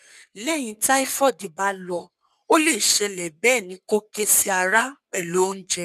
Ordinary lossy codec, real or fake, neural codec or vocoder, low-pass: none; fake; codec, 44.1 kHz, 3.4 kbps, Pupu-Codec; 14.4 kHz